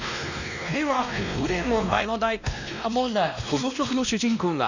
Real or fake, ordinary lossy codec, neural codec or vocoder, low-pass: fake; none; codec, 16 kHz, 1 kbps, X-Codec, WavLM features, trained on Multilingual LibriSpeech; 7.2 kHz